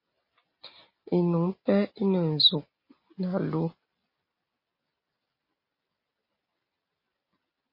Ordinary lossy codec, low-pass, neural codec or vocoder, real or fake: MP3, 24 kbps; 5.4 kHz; none; real